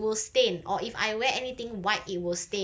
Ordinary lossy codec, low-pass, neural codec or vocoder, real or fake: none; none; none; real